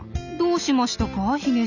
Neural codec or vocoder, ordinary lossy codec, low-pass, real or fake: none; none; 7.2 kHz; real